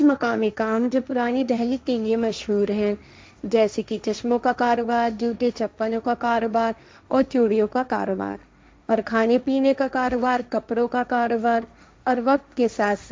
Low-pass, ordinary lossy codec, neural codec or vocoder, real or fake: none; none; codec, 16 kHz, 1.1 kbps, Voila-Tokenizer; fake